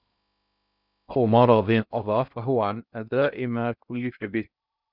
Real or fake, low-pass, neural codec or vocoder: fake; 5.4 kHz; codec, 16 kHz in and 24 kHz out, 0.6 kbps, FocalCodec, streaming, 2048 codes